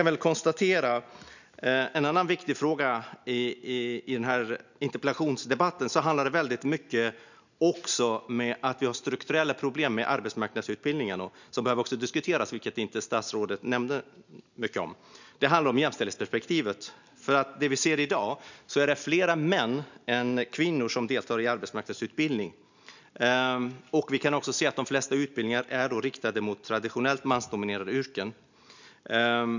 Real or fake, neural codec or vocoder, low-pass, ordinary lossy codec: real; none; 7.2 kHz; none